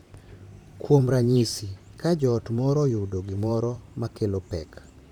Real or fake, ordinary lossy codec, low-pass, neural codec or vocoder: fake; none; 19.8 kHz; vocoder, 44.1 kHz, 128 mel bands, Pupu-Vocoder